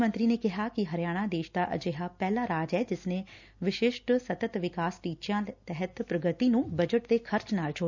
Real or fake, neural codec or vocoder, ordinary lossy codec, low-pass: real; none; none; 7.2 kHz